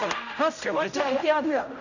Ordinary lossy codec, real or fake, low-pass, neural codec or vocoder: none; fake; 7.2 kHz; codec, 16 kHz, 0.5 kbps, X-Codec, HuBERT features, trained on general audio